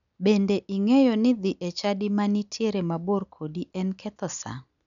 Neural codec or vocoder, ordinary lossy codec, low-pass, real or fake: none; none; 7.2 kHz; real